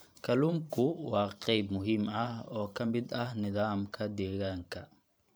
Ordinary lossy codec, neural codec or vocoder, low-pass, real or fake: none; vocoder, 44.1 kHz, 128 mel bands every 512 samples, BigVGAN v2; none; fake